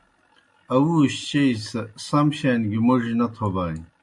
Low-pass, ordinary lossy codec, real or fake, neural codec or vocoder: 10.8 kHz; MP3, 48 kbps; real; none